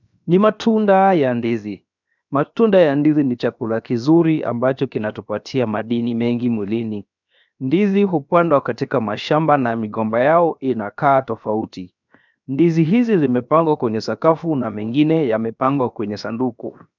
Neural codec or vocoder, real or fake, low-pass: codec, 16 kHz, 0.7 kbps, FocalCodec; fake; 7.2 kHz